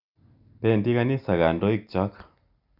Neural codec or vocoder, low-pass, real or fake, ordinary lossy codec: none; 5.4 kHz; real; none